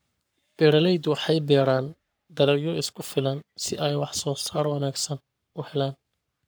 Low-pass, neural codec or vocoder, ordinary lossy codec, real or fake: none; codec, 44.1 kHz, 7.8 kbps, Pupu-Codec; none; fake